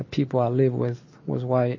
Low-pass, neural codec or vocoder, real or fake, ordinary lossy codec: 7.2 kHz; none; real; MP3, 32 kbps